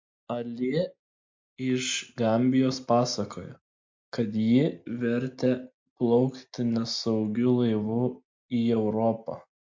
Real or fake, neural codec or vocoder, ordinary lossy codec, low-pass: real; none; MP3, 48 kbps; 7.2 kHz